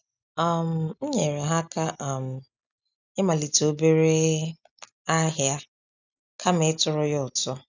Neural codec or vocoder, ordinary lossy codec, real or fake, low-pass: none; none; real; 7.2 kHz